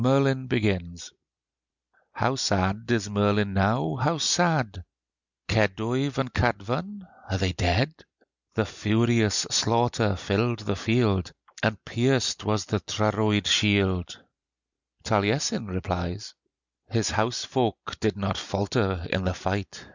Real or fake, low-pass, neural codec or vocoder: real; 7.2 kHz; none